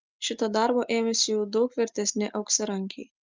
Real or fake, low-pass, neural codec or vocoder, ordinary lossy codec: real; 7.2 kHz; none; Opus, 32 kbps